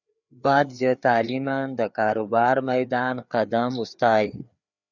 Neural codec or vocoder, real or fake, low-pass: codec, 16 kHz, 4 kbps, FreqCodec, larger model; fake; 7.2 kHz